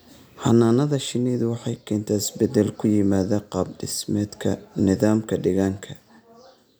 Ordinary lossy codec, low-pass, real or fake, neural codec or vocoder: none; none; real; none